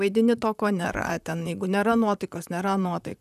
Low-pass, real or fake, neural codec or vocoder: 14.4 kHz; fake; vocoder, 44.1 kHz, 128 mel bands, Pupu-Vocoder